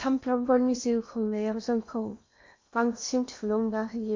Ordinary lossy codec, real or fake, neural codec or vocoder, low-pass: MP3, 48 kbps; fake; codec, 16 kHz in and 24 kHz out, 0.8 kbps, FocalCodec, streaming, 65536 codes; 7.2 kHz